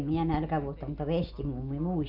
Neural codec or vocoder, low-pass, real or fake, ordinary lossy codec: none; 5.4 kHz; real; none